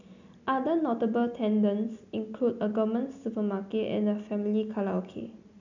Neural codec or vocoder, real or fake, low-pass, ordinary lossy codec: none; real; 7.2 kHz; none